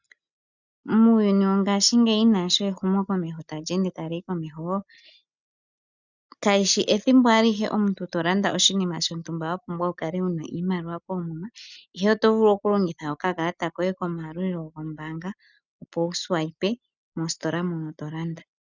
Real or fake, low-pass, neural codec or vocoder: real; 7.2 kHz; none